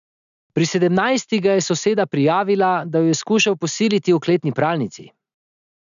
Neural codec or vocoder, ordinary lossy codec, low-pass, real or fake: none; MP3, 96 kbps; 7.2 kHz; real